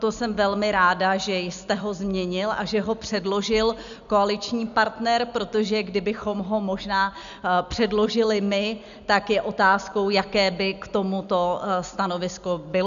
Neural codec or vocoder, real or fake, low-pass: none; real; 7.2 kHz